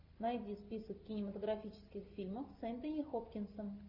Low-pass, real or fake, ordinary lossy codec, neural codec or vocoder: 5.4 kHz; real; AAC, 32 kbps; none